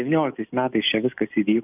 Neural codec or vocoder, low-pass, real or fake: none; 3.6 kHz; real